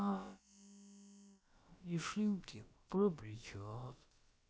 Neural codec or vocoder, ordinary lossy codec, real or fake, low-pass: codec, 16 kHz, about 1 kbps, DyCAST, with the encoder's durations; none; fake; none